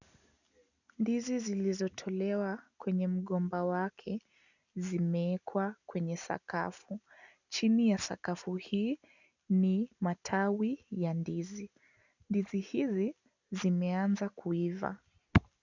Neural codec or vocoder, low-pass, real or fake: none; 7.2 kHz; real